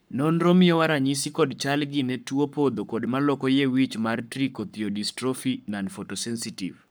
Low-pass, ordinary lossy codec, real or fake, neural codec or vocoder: none; none; fake; codec, 44.1 kHz, 7.8 kbps, Pupu-Codec